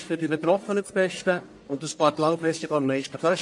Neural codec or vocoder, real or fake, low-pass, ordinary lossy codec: codec, 44.1 kHz, 1.7 kbps, Pupu-Codec; fake; 10.8 kHz; MP3, 48 kbps